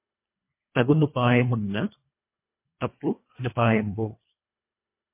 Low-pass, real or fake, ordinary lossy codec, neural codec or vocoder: 3.6 kHz; fake; MP3, 24 kbps; codec, 24 kHz, 1.5 kbps, HILCodec